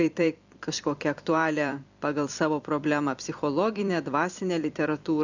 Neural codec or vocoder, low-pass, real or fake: vocoder, 44.1 kHz, 128 mel bands every 256 samples, BigVGAN v2; 7.2 kHz; fake